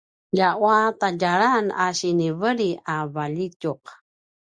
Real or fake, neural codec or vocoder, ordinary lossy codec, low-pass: real; none; Opus, 64 kbps; 9.9 kHz